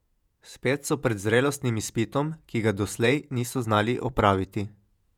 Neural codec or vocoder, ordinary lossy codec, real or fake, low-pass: vocoder, 48 kHz, 128 mel bands, Vocos; none; fake; 19.8 kHz